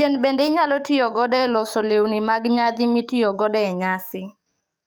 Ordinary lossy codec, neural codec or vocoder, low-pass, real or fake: none; codec, 44.1 kHz, 7.8 kbps, DAC; none; fake